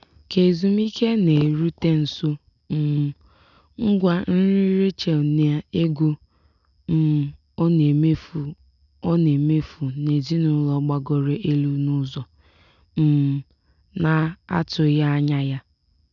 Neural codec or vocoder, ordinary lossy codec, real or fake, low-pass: none; none; real; 7.2 kHz